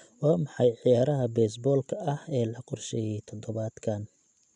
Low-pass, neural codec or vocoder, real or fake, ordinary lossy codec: 10.8 kHz; none; real; none